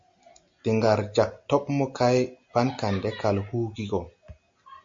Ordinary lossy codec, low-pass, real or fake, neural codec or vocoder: MP3, 48 kbps; 7.2 kHz; real; none